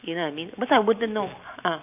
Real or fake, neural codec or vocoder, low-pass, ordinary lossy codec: fake; vocoder, 44.1 kHz, 128 mel bands every 512 samples, BigVGAN v2; 3.6 kHz; none